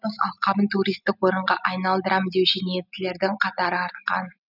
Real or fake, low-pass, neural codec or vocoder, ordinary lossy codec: real; 5.4 kHz; none; none